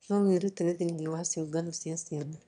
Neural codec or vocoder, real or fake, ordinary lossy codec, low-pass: autoencoder, 22.05 kHz, a latent of 192 numbers a frame, VITS, trained on one speaker; fake; none; 9.9 kHz